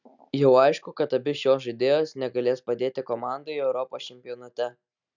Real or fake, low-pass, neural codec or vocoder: real; 7.2 kHz; none